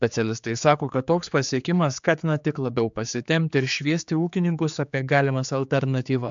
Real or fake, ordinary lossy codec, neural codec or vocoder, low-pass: fake; MP3, 64 kbps; codec, 16 kHz, 4 kbps, X-Codec, HuBERT features, trained on general audio; 7.2 kHz